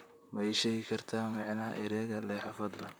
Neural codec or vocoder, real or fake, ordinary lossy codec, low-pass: vocoder, 44.1 kHz, 128 mel bands, Pupu-Vocoder; fake; none; none